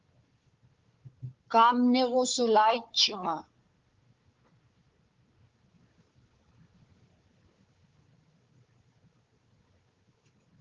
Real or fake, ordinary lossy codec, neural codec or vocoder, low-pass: fake; Opus, 32 kbps; codec, 16 kHz, 4 kbps, FunCodec, trained on Chinese and English, 50 frames a second; 7.2 kHz